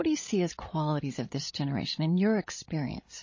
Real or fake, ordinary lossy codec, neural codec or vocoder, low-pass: fake; MP3, 32 kbps; codec, 16 kHz, 16 kbps, FreqCodec, larger model; 7.2 kHz